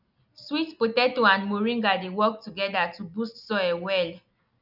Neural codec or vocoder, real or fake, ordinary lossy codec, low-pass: none; real; none; 5.4 kHz